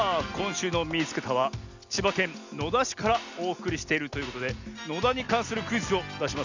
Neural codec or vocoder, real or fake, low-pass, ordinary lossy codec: none; real; 7.2 kHz; none